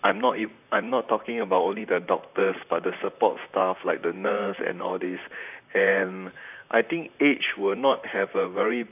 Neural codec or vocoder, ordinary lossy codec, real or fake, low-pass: vocoder, 44.1 kHz, 128 mel bands, Pupu-Vocoder; none; fake; 3.6 kHz